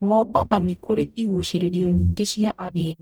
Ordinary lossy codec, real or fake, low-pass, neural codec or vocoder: none; fake; none; codec, 44.1 kHz, 0.9 kbps, DAC